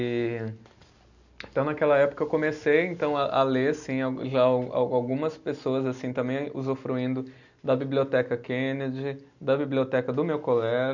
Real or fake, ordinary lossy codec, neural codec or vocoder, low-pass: real; none; none; 7.2 kHz